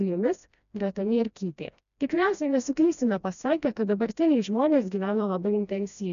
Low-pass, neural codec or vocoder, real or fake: 7.2 kHz; codec, 16 kHz, 1 kbps, FreqCodec, smaller model; fake